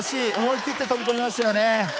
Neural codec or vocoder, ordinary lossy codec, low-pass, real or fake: codec, 16 kHz, 4 kbps, X-Codec, HuBERT features, trained on balanced general audio; none; none; fake